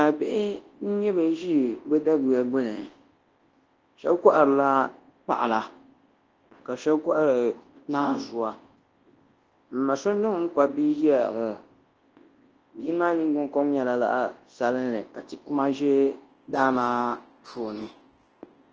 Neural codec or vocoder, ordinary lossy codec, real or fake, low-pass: codec, 24 kHz, 0.9 kbps, WavTokenizer, large speech release; Opus, 16 kbps; fake; 7.2 kHz